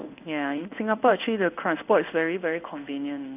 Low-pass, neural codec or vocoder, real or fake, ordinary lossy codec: 3.6 kHz; codec, 16 kHz in and 24 kHz out, 1 kbps, XY-Tokenizer; fake; none